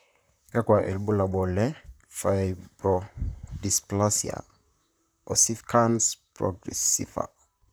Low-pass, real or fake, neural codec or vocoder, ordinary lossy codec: none; fake; vocoder, 44.1 kHz, 128 mel bands, Pupu-Vocoder; none